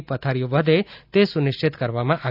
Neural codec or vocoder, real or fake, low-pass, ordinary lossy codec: none; real; 5.4 kHz; none